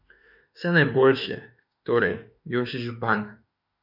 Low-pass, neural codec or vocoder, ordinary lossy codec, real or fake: 5.4 kHz; autoencoder, 48 kHz, 32 numbers a frame, DAC-VAE, trained on Japanese speech; none; fake